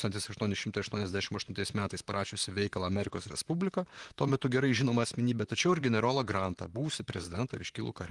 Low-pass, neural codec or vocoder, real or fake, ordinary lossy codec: 10.8 kHz; vocoder, 44.1 kHz, 128 mel bands, Pupu-Vocoder; fake; Opus, 24 kbps